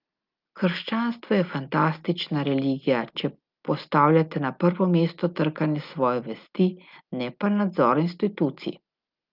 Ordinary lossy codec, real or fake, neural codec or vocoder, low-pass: Opus, 24 kbps; real; none; 5.4 kHz